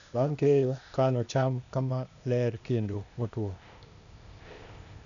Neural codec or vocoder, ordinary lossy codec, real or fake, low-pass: codec, 16 kHz, 0.8 kbps, ZipCodec; none; fake; 7.2 kHz